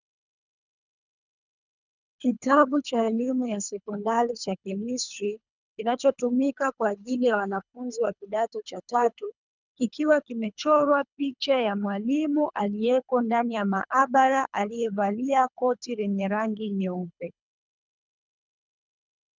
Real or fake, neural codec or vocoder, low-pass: fake; codec, 24 kHz, 3 kbps, HILCodec; 7.2 kHz